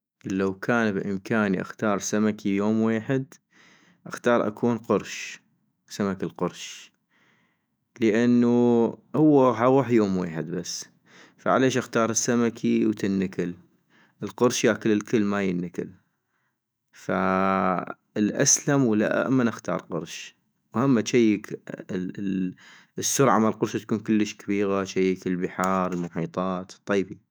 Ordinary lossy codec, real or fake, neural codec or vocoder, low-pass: none; fake; autoencoder, 48 kHz, 128 numbers a frame, DAC-VAE, trained on Japanese speech; none